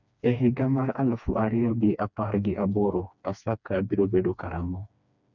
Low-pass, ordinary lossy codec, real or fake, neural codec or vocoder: 7.2 kHz; none; fake; codec, 16 kHz, 2 kbps, FreqCodec, smaller model